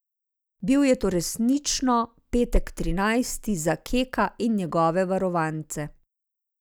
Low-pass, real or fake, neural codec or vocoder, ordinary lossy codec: none; real; none; none